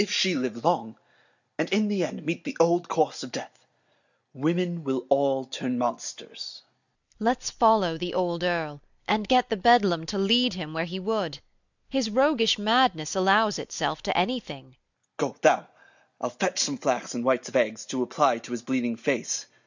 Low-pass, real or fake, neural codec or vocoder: 7.2 kHz; real; none